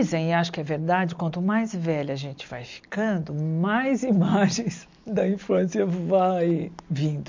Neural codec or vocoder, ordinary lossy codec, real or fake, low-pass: none; MP3, 64 kbps; real; 7.2 kHz